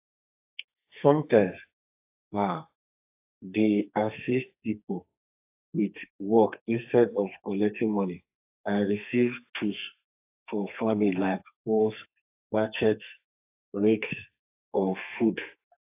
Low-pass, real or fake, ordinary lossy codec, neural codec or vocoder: 3.6 kHz; fake; AAC, 24 kbps; codec, 32 kHz, 1.9 kbps, SNAC